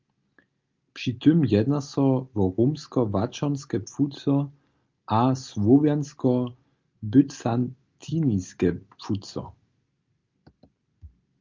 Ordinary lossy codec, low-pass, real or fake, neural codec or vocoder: Opus, 24 kbps; 7.2 kHz; real; none